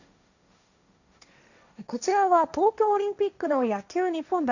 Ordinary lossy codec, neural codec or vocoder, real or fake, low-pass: none; codec, 16 kHz, 1.1 kbps, Voila-Tokenizer; fake; 7.2 kHz